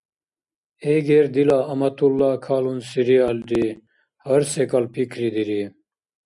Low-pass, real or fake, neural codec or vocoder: 10.8 kHz; real; none